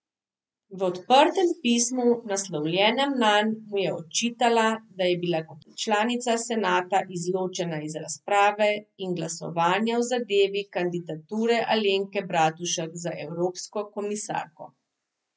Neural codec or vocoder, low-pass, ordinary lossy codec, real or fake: none; none; none; real